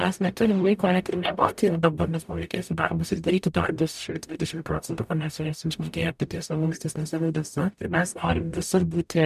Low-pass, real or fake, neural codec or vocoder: 14.4 kHz; fake; codec, 44.1 kHz, 0.9 kbps, DAC